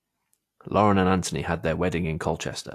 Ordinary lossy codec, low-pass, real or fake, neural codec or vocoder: AAC, 64 kbps; 14.4 kHz; real; none